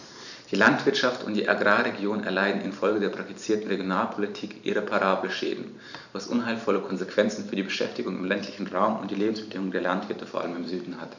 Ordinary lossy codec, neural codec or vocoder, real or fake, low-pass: none; vocoder, 44.1 kHz, 128 mel bands every 512 samples, BigVGAN v2; fake; 7.2 kHz